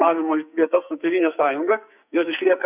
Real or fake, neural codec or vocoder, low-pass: fake; codec, 44.1 kHz, 2.6 kbps, SNAC; 3.6 kHz